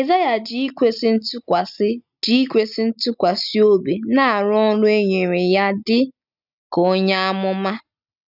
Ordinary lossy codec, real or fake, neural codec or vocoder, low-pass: none; real; none; 5.4 kHz